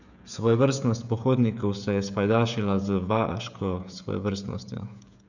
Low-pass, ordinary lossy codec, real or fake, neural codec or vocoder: 7.2 kHz; none; fake; codec, 16 kHz, 16 kbps, FreqCodec, smaller model